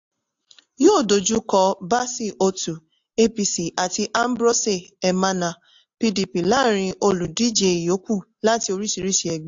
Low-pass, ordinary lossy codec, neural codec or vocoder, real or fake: 7.2 kHz; AAC, 64 kbps; none; real